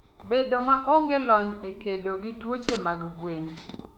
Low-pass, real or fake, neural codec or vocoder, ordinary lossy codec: 19.8 kHz; fake; autoencoder, 48 kHz, 32 numbers a frame, DAC-VAE, trained on Japanese speech; none